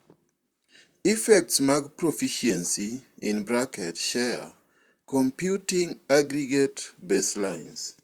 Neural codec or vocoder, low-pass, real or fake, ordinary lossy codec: vocoder, 44.1 kHz, 128 mel bands, Pupu-Vocoder; 19.8 kHz; fake; Opus, 64 kbps